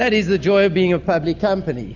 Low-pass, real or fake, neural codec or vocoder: 7.2 kHz; real; none